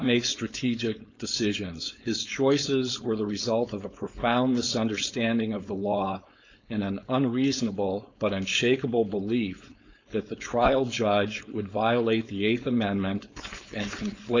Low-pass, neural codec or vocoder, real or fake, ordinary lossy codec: 7.2 kHz; codec, 16 kHz, 4.8 kbps, FACodec; fake; MP3, 64 kbps